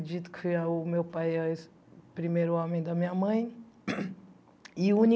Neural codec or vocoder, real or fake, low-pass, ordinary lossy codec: none; real; none; none